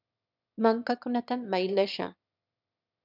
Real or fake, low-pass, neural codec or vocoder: fake; 5.4 kHz; autoencoder, 22.05 kHz, a latent of 192 numbers a frame, VITS, trained on one speaker